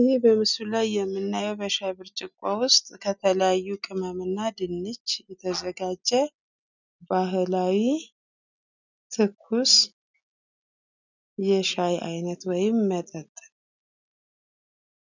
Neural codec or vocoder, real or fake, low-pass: none; real; 7.2 kHz